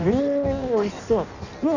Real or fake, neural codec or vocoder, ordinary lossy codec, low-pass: fake; codec, 16 kHz in and 24 kHz out, 0.6 kbps, FireRedTTS-2 codec; none; 7.2 kHz